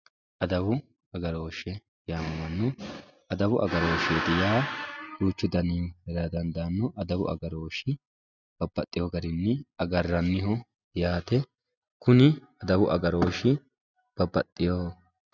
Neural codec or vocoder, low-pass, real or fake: none; 7.2 kHz; real